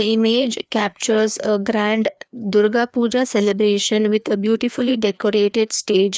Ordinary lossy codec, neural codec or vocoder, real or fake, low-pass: none; codec, 16 kHz, 2 kbps, FreqCodec, larger model; fake; none